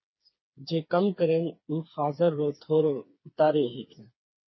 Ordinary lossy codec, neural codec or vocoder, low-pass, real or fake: MP3, 24 kbps; codec, 16 kHz, 4 kbps, FreqCodec, smaller model; 7.2 kHz; fake